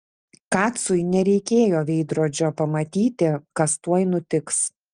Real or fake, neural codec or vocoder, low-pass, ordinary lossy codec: real; none; 9.9 kHz; Opus, 32 kbps